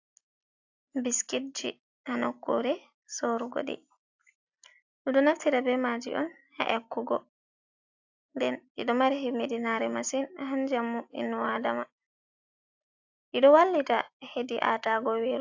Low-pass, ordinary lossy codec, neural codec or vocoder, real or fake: 7.2 kHz; AAC, 48 kbps; none; real